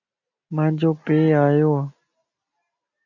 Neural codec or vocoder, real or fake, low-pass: none; real; 7.2 kHz